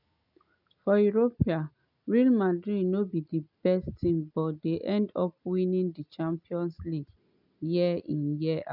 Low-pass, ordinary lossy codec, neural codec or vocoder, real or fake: 5.4 kHz; none; none; real